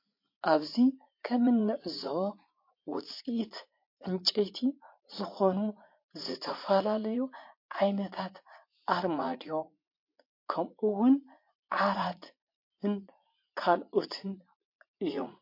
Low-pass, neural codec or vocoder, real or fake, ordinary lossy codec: 5.4 kHz; vocoder, 44.1 kHz, 80 mel bands, Vocos; fake; MP3, 32 kbps